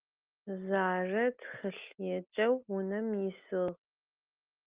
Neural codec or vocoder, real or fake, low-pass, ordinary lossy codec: none; real; 3.6 kHz; Opus, 32 kbps